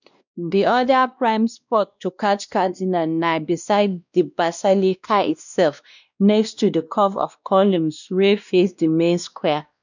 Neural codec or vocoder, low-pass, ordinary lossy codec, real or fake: codec, 16 kHz, 1 kbps, X-Codec, WavLM features, trained on Multilingual LibriSpeech; 7.2 kHz; none; fake